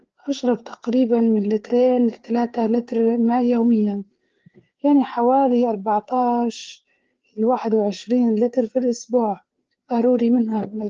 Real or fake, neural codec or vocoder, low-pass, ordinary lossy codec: fake; codec, 16 kHz, 4 kbps, FreqCodec, larger model; 7.2 kHz; Opus, 16 kbps